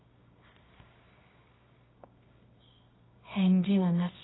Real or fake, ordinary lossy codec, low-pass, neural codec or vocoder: fake; AAC, 16 kbps; 7.2 kHz; codec, 24 kHz, 0.9 kbps, WavTokenizer, medium music audio release